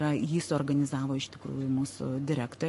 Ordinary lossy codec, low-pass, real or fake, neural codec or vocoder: MP3, 48 kbps; 14.4 kHz; fake; codec, 44.1 kHz, 7.8 kbps, Pupu-Codec